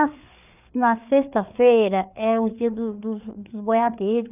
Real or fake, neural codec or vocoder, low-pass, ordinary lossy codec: fake; codec, 16 kHz, 4 kbps, FreqCodec, larger model; 3.6 kHz; none